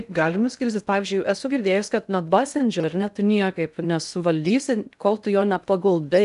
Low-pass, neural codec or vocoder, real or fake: 10.8 kHz; codec, 16 kHz in and 24 kHz out, 0.6 kbps, FocalCodec, streaming, 2048 codes; fake